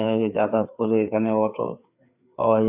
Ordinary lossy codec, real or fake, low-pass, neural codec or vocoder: AAC, 32 kbps; fake; 3.6 kHz; codec, 16 kHz, 4 kbps, FreqCodec, larger model